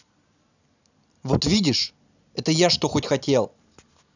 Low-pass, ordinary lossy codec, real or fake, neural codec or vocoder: 7.2 kHz; none; real; none